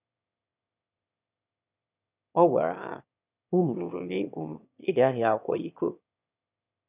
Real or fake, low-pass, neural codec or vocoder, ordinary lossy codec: fake; 3.6 kHz; autoencoder, 22.05 kHz, a latent of 192 numbers a frame, VITS, trained on one speaker; none